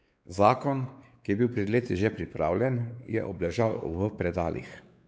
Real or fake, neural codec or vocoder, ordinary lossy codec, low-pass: fake; codec, 16 kHz, 4 kbps, X-Codec, WavLM features, trained on Multilingual LibriSpeech; none; none